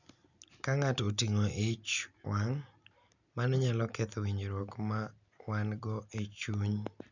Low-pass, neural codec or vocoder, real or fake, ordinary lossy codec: 7.2 kHz; none; real; none